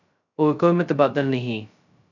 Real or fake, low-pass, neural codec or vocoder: fake; 7.2 kHz; codec, 16 kHz, 0.2 kbps, FocalCodec